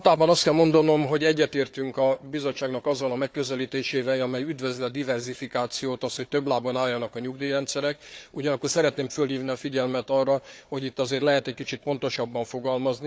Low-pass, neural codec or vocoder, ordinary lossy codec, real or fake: none; codec, 16 kHz, 4 kbps, FunCodec, trained on Chinese and English, 50 frames a second; none; fake